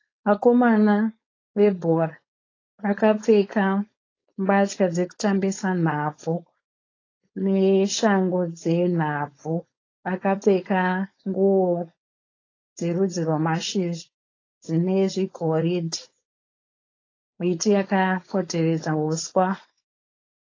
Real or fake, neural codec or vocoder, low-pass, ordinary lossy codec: fake; codec, 16 kHz, 4.8 kbps, FACodec; 7.2 kHz; AAC, 32 kbps